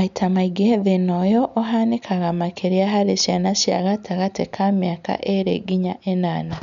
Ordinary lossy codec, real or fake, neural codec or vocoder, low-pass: none; real; none; 7.2 kHz